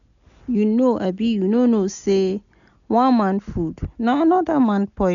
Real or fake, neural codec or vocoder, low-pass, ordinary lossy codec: real; none; 7.2 kHz; none